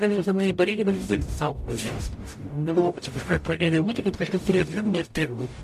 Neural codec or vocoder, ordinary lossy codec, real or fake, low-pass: codec, 44.1 kHz, 0.9 kbps, DAC; MP3, 64 kbps; fake; 14.4 kHz